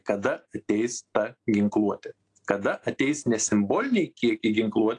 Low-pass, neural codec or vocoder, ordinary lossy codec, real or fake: 9.9 kHz; none; AAC, 48 kbps; real